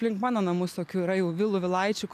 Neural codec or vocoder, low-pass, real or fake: none; 14.4 kHz; real